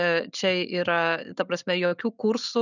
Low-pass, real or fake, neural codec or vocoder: 7.2 kHz; real; none